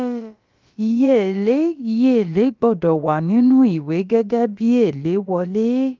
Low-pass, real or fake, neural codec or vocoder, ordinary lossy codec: 7.2 kHz; fake; codec, 16 kHz, about 1 kbps, DyCAST, with the encoder's durations; Opus, 32 kbps